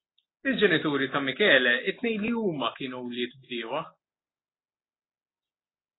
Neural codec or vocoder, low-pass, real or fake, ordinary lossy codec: none; 7.2 kHz; real; AAC, 16 kbps